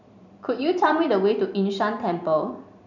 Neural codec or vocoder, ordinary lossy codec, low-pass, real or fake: vocoder, 44.1 kHz, 128 mel bands every 256 samples, BigVGAN v2; none; 7.2 kHz; fake